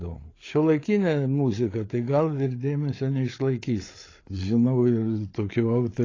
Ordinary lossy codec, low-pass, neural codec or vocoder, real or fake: AAC, 32 kbps; 7.2 kHz; codec, 16 kHz, 8 kbps, FreqCodec, larger model; fake